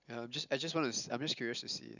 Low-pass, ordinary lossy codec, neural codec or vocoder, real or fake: 7.2 kHz; none; none; real